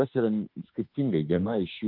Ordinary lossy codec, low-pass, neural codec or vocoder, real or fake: Opus, 32 kbps; 5.4 kHz; autoencoder, 48 kHz, 32 numbers a frame, DAC-VAE, trained on Japanese speech; fake